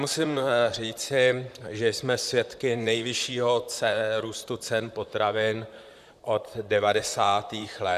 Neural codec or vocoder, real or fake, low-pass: vocoder, 44.1 kHz, 128 mel bands, Pupu-Vocoder; fake; 14.4 kHz